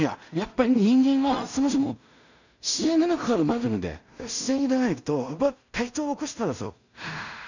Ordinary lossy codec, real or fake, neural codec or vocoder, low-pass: none; fake; codec, 16 kHz in and 24 kHz out, 0.4 kbps, LongCat-Audio-Codec, two codebook decoder; 7.2 kHz